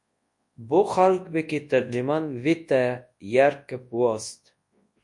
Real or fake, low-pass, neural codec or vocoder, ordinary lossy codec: fake; 10.8 kHz; codec, 24 kHz, 0.9 kbps, WavTokenizer, large speech release; MP3, 48 kbps